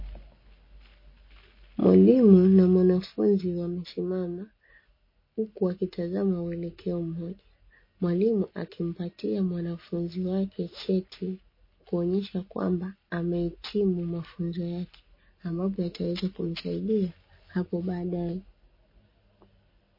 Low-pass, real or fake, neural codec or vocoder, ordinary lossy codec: 5.4 kHz; real; none; MP3, 24 kbps